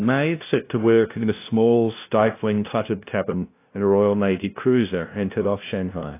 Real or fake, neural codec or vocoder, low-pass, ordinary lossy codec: fake; codec, 16 kHz, 0.5 kbps, FunCodec, trained on LibriTTS, 25 frames a second; 3.6 kHz; AAC, 24 kbps